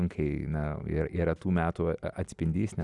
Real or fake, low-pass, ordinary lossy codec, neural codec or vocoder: real; 10.8 kHz; Opus, 64 kbps; none